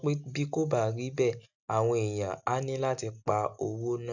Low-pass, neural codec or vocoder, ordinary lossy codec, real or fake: 7.2 kHz; none; none; real